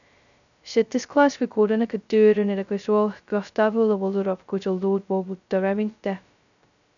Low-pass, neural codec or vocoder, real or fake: 7.2 kHz; codec, 16 kHz, 0.2 kbps, FocalCodec; fake